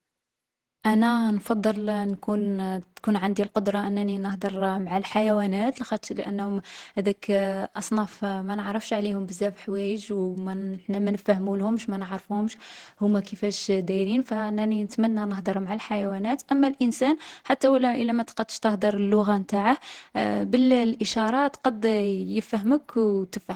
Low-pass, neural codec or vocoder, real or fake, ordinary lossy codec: 19.8 kHz; vocoder, 48 kHz, 128 mel bands, Vocos; fake; Opus, 16 kbps